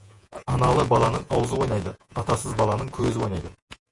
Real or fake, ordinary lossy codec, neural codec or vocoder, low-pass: fake; MP3, 48 kbps; vocoder, 48 kHz, 128 mel bands, Vocos; 10.8 kHz